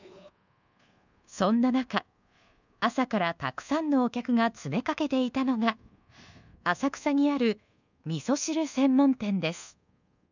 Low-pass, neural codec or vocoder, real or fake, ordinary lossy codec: 7.2 kHz; codec, 24 kHz, 1.2 kbps, DualCodec; fake; none